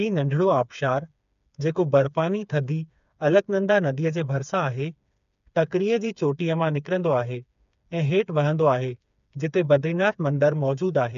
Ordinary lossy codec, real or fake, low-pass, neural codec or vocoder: none; fake; 7.2 kHz; codec, 16 kHz, 4 kbps, FreqCodec, smaller model